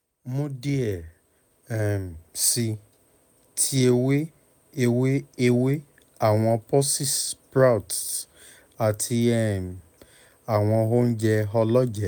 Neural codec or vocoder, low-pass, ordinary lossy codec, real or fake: none; none; none; real